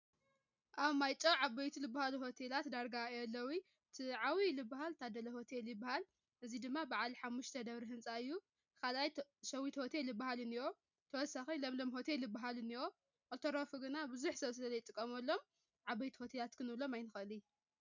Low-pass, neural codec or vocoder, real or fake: 7.2 kHz; none; real